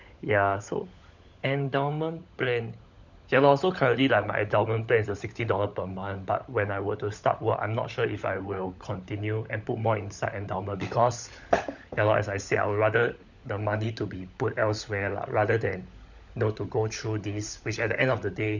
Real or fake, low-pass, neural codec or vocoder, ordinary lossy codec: fake; 7.2 kHz; codec, 16 kHz, 16 kbps, FunCodec, trained on LibriTTS, 50 frames a second; none